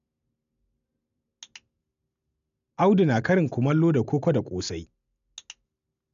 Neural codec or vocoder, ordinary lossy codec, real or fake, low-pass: none; none; real; 7.2 kHz